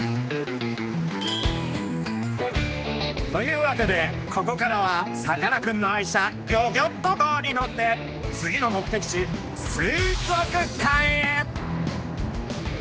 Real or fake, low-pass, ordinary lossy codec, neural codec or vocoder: fake; none; none; codec, 16 kHz, 2 kbps, X-Codec, HuBERT features, trained on general audio